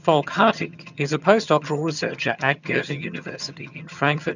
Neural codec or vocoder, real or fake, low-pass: vocoder, 22.05 kHz, 80 mel bands, HiFi-GAN; fake; 7.2 kHz